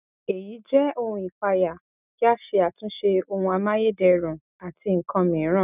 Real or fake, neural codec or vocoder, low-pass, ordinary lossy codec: real; none; 3.6 kHz; none